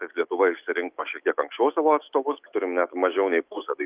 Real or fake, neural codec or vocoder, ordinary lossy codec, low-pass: fake; autoencoder, 48 kHz, 128 numbers a frame, DAC-VAE, trained on Japanese speech; Opus, 32 kbps; 3.6 kHz